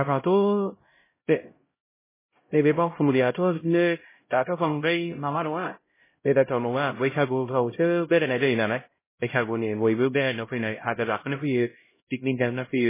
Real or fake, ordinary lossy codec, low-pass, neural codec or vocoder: fake; MP3, 16 kbps; 3.6 kHz; codec, 16 kHz, 0.5 kbps, X-Codec, HuBERT features, trained on LibriSpeech